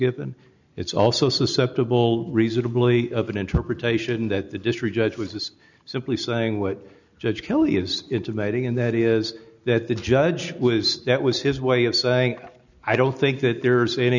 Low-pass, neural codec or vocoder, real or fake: 7.2 kHz; none; real